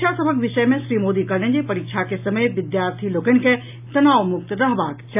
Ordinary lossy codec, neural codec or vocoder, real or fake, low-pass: AAC, 32 kbps; none; real; 3.6 kHz